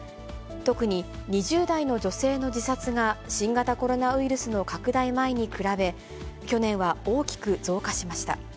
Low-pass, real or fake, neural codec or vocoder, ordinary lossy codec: none; real; none; none